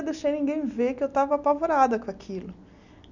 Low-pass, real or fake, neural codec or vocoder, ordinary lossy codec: 7.2 kHz; real; none; none